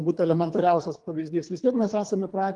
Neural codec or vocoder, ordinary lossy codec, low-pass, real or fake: codec, 24 kHz, 3 kbps, HILCodec; Opus, 24 kbps; 10.8 kHz; fake